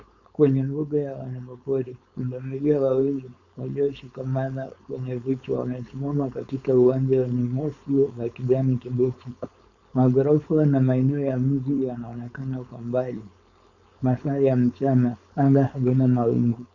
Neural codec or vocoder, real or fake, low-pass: codec, 16 kHz, 4.8 kbps, FACodec; fake; 7.2 kHz